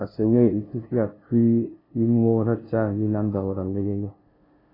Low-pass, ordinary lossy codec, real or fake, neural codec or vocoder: 5.4 kHz; AAC, 24 kbps; fake; codec, 16 kHz, 0.5 kbps, FunCodec, trained on LibriTTS, 25 frames a second